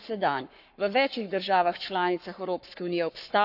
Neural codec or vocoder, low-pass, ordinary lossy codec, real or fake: codec, 44.1 kHz, 7.8 kbps, Pupu-Codec; 5.4 kHz; none; fake